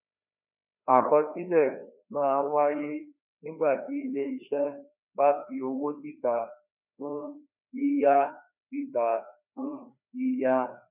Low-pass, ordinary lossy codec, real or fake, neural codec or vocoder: 3.6 kHz; none; fake; codec, 16 kHz, 2 kbps, FreqCodec, larger model